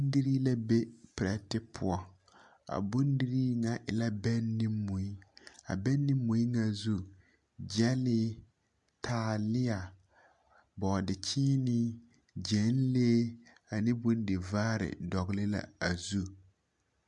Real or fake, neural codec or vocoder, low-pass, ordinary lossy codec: real; none; 10.8 kHz; MP3, 64 kbps